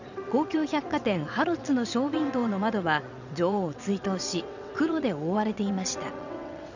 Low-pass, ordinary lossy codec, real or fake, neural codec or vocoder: 7.2 kHz; none; fake; vocoder, 22.05 kHz, 80 mel bands, WaveNeXt